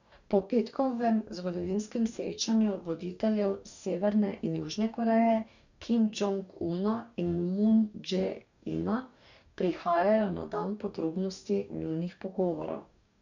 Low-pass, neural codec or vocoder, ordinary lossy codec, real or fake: 7.2 kHz; codec, 44.1 kHz, 2.6 kbps, DAC; none; fake